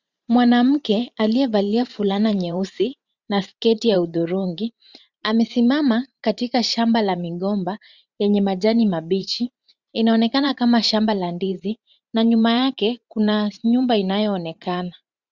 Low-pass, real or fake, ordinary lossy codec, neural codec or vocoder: 7.2 kHz; fake; Opus, 64 kbps; vocoder, 44.1 kHz, 128 mel bands every 512 samples, BigVGAN v2